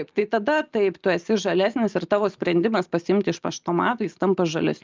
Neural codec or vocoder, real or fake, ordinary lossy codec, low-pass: none; real; Opus, 24 kbps; 7.2 kHz